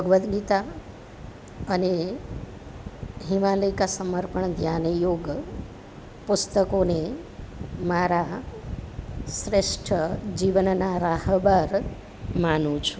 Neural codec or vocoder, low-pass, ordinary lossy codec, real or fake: none; none; none; real